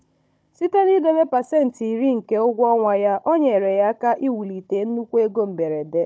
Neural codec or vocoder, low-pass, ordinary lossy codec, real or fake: codec, 16 kHz, 16 kbps, FunCodec, trained on Chinese and English, 50 frames a second; none; none; fake